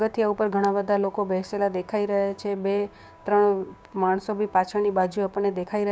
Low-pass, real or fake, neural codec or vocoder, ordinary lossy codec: none; fake; codec, 16 kHz, 6 kbps, DAC; none